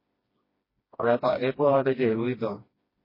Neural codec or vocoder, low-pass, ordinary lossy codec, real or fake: codec, 16 kHz, 1 kbps, FreqCodec, smaller model; 5.4 kHz; MP3, 24 kbps; fake